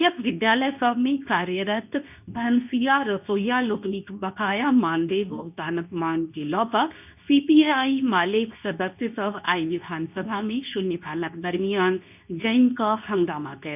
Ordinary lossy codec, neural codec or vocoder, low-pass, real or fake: none; codec, 24 kHz, 0.9 kbps, WavTokenizer, medium speech release version 2; 3.6 kHz; fake